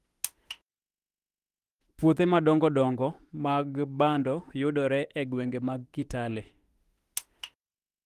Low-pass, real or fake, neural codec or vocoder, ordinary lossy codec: 14.4 kHz; fake; autoencoder, 48 kHz, 32 numbers a frame, DAC-VAE, trained on Japanese speech; Opus, 24 kbps